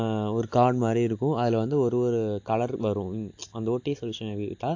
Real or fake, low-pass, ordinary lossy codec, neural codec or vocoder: real; 7.2 kHz; AAC, 48 kbps; none